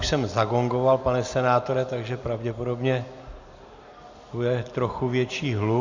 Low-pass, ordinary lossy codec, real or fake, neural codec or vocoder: 7.2 kHz; MP3, 64 kbps; real; none